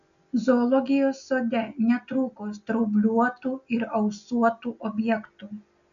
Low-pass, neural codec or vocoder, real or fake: 7.2 kHz; none; real